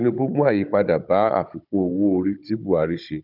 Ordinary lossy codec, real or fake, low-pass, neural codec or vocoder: none; fake; 5.4 kHz; codec, 16 kHz, 16 kbps, FunCodec, trained on Chinese and English, 50 frames a second